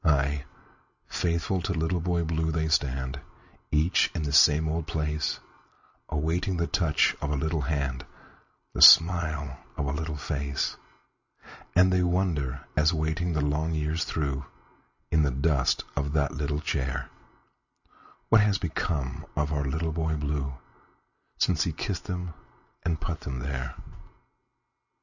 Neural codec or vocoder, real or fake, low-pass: none; real; 7.2 kHz